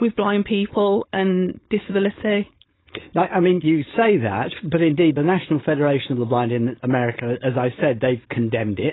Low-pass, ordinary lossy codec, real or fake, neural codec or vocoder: 7.2 kHz; AAC, 16 kbps; fake; codec, 16 kHz, 4.8 kbps, FACodec